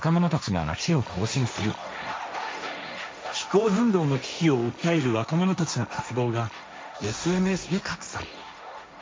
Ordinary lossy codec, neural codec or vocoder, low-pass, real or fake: none; codec, 16 kHz, 1.1 kbps, Voila-Tokenizer; none; fake